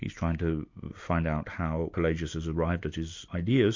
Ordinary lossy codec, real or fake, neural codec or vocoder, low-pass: MP3, 48 kbps; real; none; 7.2 kHz